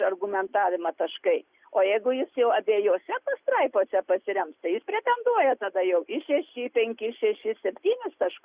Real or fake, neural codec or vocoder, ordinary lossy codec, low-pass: real; none; Opus, 64 kbps; 3.6 kHz